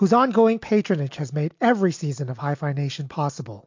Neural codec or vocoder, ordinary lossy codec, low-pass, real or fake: none; MP3, 48 kbps; 7.2 kHz; real